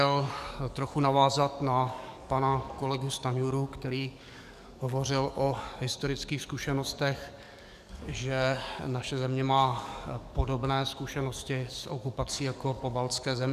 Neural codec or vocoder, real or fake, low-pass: codec, 44.1 kHz, 7.8 kbps, DAC; fake; 14.4 kHz